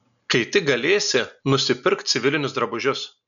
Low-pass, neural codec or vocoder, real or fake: 7.2 kHz; none; real